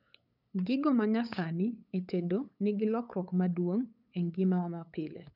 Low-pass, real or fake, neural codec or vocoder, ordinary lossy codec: 5.4 kHz; fake; codec, 16 kHz, 8 kbps, FunCodec, trained on LibriTTS, 25 frames a second; none